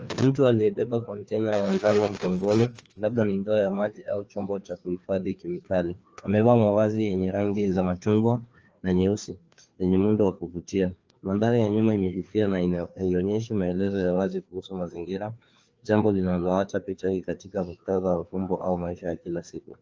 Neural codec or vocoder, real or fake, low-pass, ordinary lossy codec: codec, 16 kHz, 2 kbps, FreqCodec, larger model; fake; 7.2 kHz; Opus, 24 kbps